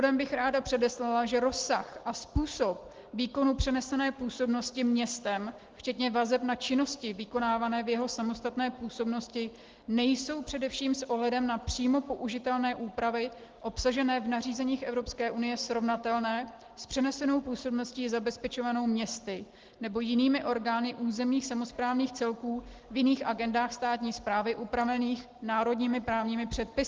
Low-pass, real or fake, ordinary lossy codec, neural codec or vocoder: 7.2 kHz; real; Opus, 16 kbps; none